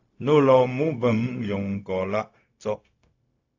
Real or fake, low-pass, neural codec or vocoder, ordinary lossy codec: fake; 7.2 kHz; codec, 16 kHz, 0.4 kbps, LongCat-Audio-Codec; AAC, 48 kbps